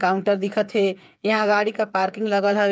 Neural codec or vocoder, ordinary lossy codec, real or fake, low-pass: codec, 16 kHz, 8 kbps, FreqCodec, smaller model; none; fake; none